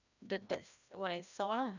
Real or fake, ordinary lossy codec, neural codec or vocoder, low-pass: fake; none; codec, 16 kHz, 1 kbps, FreqCodec, larger model; 7.2 kHz